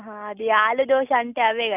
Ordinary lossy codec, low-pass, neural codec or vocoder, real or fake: none; 3.6 kHz; none; real